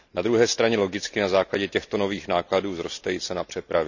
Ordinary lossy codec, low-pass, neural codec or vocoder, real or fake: none; 7.2 kHz; none; real